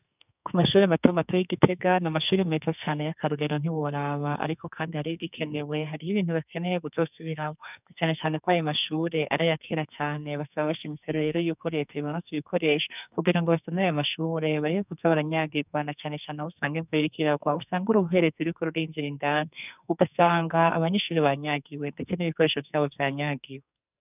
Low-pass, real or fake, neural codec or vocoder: 3.6 kHz; fake; codec, 44.1 kHz, 2.6 kbps, SNAC